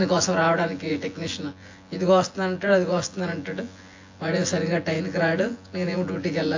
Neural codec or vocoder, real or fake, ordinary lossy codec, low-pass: vocoder, 24 kHz, 100 mel bands, Vocos; fake; AAC, 48 kbps; 7.2 kHz